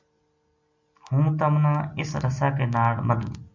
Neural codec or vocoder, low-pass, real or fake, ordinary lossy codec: none; 7.2 kHz; real; MP3, 64 kbps